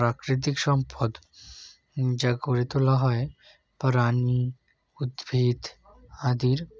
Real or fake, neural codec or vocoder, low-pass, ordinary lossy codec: real; none; none; none